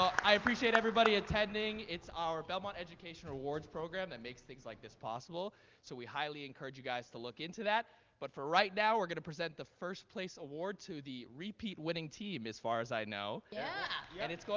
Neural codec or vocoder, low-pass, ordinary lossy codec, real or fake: none; 7.2 kHz; Opus, 32 kbps; real